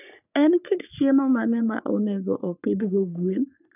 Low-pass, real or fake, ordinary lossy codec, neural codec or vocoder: 3.6 kHz; fake; none; codec, 44.1 kHz, 3.4 kbps, Pupu-Codec